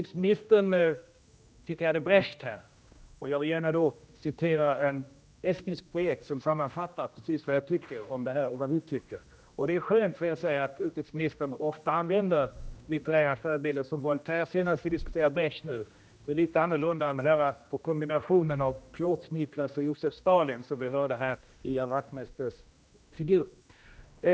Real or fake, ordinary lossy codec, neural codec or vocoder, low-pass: fake; none; codec, 16 kHz, 1 kbps, X-Codec, HuBERT features, trained on general audio; none